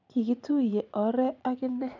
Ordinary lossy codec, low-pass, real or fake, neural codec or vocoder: none; 7.2 kHz; fake; vocoder, 44.1 kHz, 80 mel bands, Vocos